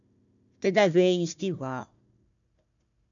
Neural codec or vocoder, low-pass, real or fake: codec, 16 kHz, 1 kbps, FunCodec, trained on Chinese and English, 50 frames a second; 7.2 kHz; fake